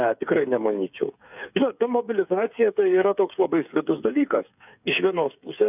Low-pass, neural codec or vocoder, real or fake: 3.6 kHz; codec, 16 kHz, 8 kbps, FreqCodec, smaller model; fake